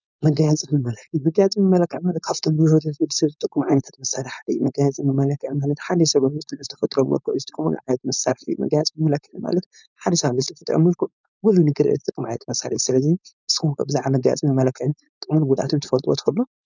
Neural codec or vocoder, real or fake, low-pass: codec, 16 kHz, 4.8 kbps, FACodec; fake; 7.2 kHz